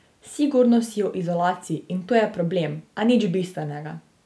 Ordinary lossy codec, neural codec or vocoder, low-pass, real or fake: none; none; none; real